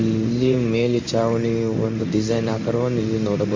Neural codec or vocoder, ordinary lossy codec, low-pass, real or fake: codec, 16 kHz in and 24 kHz out, 1 kbps, XY-Tokenizer; MP3, 64 kbps; 7.2 kHz; fake